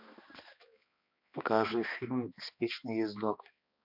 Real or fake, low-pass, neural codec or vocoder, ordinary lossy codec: fake; 5.4 kHz; codec, 16 kHz, 2 kbps, X-Codec, HuBERT features, trained on balanced general audio; MP3, 48 kbps